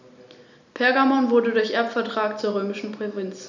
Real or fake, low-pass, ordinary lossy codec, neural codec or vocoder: real; 7.2 kHz; none; none